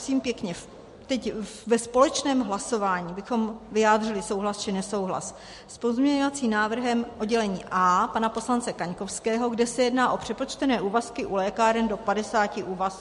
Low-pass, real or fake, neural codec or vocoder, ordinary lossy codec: 14.4 kHz; real; none; MP3, 48 kbps